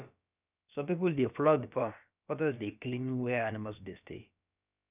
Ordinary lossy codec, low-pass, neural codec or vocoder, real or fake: none; 3.6 kHz; codec, 16 kHz, about 1 kbps, DyCAST, with the encoder's durations; fake